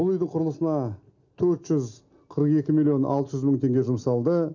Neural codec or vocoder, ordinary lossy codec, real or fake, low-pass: none; none; real; 7.2 kHz